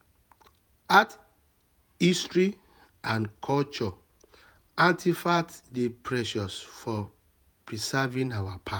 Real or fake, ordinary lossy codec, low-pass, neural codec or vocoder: fake; none; none; vocoder, 48 kHz, 128 mel bands, Vocos